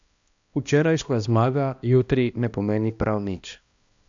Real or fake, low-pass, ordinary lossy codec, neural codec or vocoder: fake; 7.2 kHz; AAC, 64 kbps; codec, 16 kHz, 1 kbps, X-Codec, HuBERT features, trained on balanced general audio